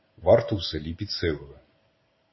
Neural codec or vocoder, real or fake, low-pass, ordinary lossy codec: none; real; 7.2 kHz; MP3, 24 kbps